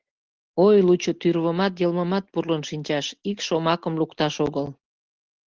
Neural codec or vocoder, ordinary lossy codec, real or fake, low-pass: none; Opus, 16 kbps; real; 7.2 kHz